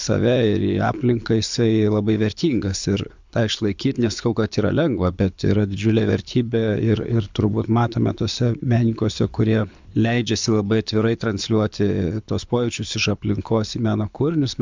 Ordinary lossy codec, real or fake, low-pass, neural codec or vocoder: MP3, 64 kbps; fake; 7.2 kHz; codec, 24 kHz, 6 kbps, HILCodec